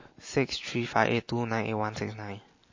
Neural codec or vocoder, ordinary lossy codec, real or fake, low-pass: none; MP3, 32 kbps; real; 7.2 kHz